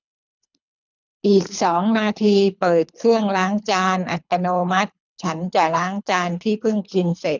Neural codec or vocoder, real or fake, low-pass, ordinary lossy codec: codec, 24 kHz, 3 kbps, HILCodec; fake; 7.2 kHz; none